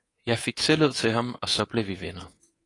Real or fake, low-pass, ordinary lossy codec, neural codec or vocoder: fake; 10.8 kHz; AAC, 32 kbps; codec, 24 kHz, 3.1 kbps, DualCodec